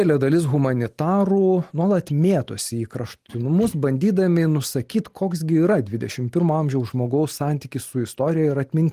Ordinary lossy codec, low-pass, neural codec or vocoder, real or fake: Opus, 24 kbps; 14.4 kHz; none; real